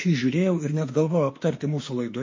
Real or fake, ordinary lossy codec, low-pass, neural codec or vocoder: fake; MP3, 32 kbps; 7.2 kHz; autoencoder, 48 kHz, 32 numbers a frame, DAC-VAE, trained on Japanese speech